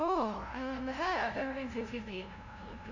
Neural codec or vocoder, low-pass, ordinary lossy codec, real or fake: codec, 16 kHz, 0.5 kbps, FunCodec, trained on LibriTTS, 25 frames a second; 7.2 kHz; none; fake